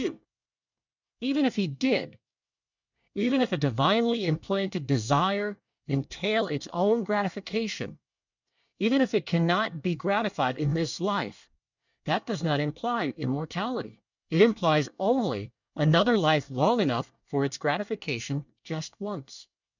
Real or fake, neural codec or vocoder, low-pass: fake; codec, 24 kHz, 1 kbps, SNAC; 7.2 kHz